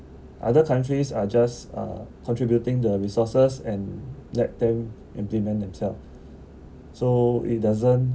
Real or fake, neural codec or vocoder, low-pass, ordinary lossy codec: real; none; none; none